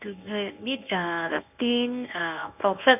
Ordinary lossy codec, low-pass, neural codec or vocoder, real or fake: none; 3.6 kHz; codec, 24 kHz, 0.9 kbps, WavTokenizer, medium speech release version 1; fake